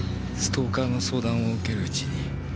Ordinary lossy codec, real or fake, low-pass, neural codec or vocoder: none; real; none; none